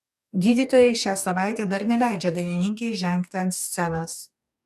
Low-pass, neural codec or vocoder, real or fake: 14.4 kHz; codec, 44.1 kHz, 2.6 kbps, DAC; fake